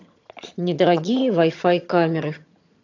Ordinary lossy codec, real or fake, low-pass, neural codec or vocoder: AAC, 48 kbps; fake; 7.2 kHz; vocoder, 22.05 kHz, 80 mel bands, HiFi-GAN